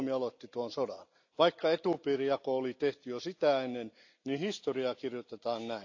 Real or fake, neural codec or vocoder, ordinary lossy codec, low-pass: real; none; AAC, 48 kbps; 7.2 kHz